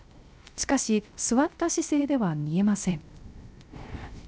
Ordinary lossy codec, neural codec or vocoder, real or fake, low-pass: none; codec, 16 kHz, 0.3 kbps, FocalCodec; fake; none